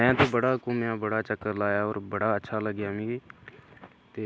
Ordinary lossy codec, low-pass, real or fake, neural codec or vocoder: none; none; real; none